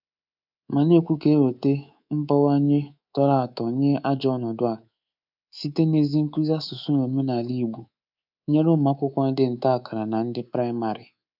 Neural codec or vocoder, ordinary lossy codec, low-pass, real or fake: codec, 24 kHz, 3.1 kbps, DualCodec; none; 5.4 kHz; fake